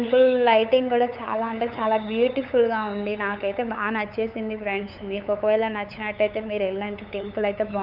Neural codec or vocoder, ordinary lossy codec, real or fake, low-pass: codec, 16 kHz, 8 kbps, FunCodec, trained on LibriTTS, 25 frames a second; none; fake; 5.4 kHz